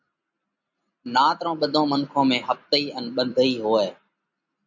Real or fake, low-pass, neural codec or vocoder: real; 7.2 kHz; none